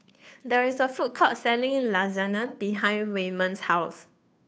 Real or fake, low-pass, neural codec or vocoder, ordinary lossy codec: fake; none; codec, 16 kHz, 2 kbps, FunCodec, trained on Chinese and English, 25 frames a second; none